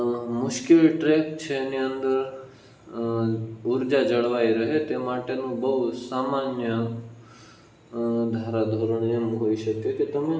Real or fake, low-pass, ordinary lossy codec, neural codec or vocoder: real; none; none; none